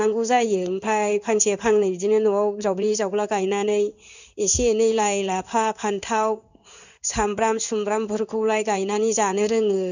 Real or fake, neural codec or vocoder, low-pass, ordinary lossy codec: fake; codec, 16 kHz in and 24 kHz out, 1 kbps, XY-Tokenizer; 7.2 kHz; none